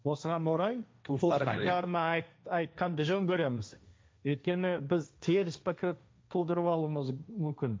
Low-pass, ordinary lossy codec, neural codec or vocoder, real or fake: none; none; codec, 16 kHz, 1.1 kbps, Voila-Tokenizer; fake